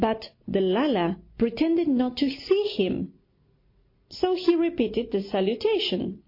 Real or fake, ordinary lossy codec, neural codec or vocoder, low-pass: real; MP3, 32 kbps; none; 5.4 kHz